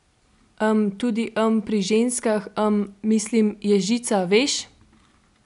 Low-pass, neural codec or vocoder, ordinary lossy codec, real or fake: 10.8 kHz; none; none; real